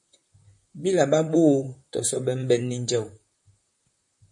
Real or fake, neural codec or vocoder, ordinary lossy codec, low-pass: fake; vocoder, 44.1 kHz, 128 mel bands, Pupu-Vocoder; MP3, 48 kbps; 10.8 kHz